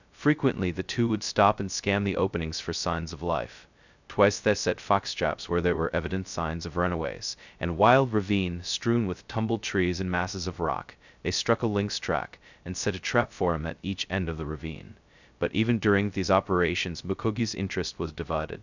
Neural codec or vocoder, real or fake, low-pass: codec, 16 kHz, 0.2 kbps, FocalCodec; fake; 7.2 kHz